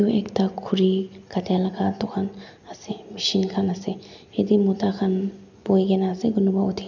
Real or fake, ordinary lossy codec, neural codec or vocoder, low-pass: real; none; none; 7.2 kHz